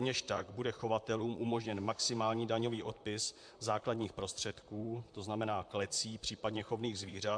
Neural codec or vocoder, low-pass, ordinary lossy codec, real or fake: vocoder, 44.1 kHz, 128 mel bands, Pupu-Vocoder; 9.9 kHz; MP3, 64 kbps; fake